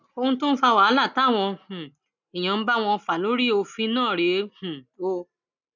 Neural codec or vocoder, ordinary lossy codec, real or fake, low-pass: none; none; real; 7.2 kHz